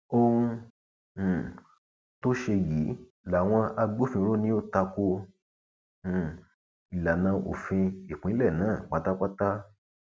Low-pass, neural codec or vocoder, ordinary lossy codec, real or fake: none; none; none; real